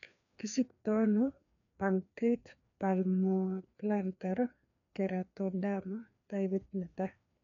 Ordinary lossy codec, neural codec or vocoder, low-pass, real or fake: none; codec, 16 kHz, 2 kbps, FreqCodec, larger model; 7.2 kHz; fake